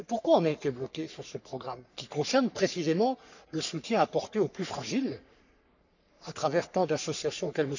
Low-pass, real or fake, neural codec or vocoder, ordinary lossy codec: 7.2 kHz; fake; codec, 44.1 kHz, 3.4 kbps, Pupu-Codec; none